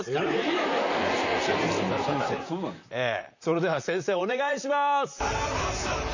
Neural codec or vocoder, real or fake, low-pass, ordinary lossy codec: vocoder, 44.1 kHz, 128 mel bands, Pupu-Vocoder; fake; 7.2 kHz; none